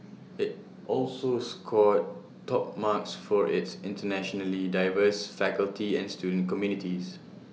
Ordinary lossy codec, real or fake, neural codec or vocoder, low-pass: none; real; none; none